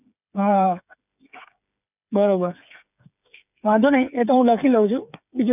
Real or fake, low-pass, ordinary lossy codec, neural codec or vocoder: fake; 3.6 kHz; none; codec, 16 kHz, 8 kbps, FreqCodec, smaller model